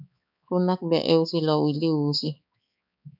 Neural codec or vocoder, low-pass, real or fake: codec, 16 kHz, 4 kbps, X-Codec, HuBERT features, trained on balanced general audio; 5.4 kHz; fake